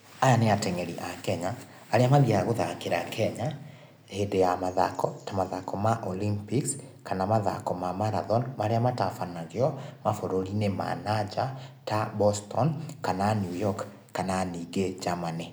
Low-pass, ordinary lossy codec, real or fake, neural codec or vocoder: none; none; real; none